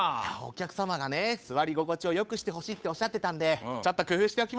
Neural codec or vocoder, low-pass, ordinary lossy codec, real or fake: codec, 16 kHz, 8 kbps, FunCodec, trained on Chinese and English, 25 frames a second; none; none; fake